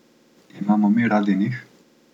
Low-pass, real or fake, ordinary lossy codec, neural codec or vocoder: 19.8 kHz; real; none; none